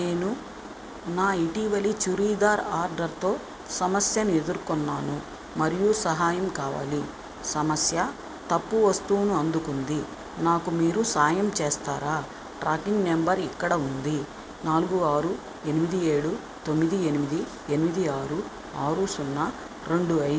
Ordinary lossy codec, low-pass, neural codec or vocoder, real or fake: none; none; none; real